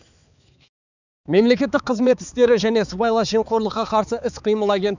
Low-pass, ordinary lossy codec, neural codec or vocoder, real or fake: 7.2 kHz; none; codec, 16 kHz, 4 kbps, X-Codec, HuBERT features, trained on balanced general audio; fake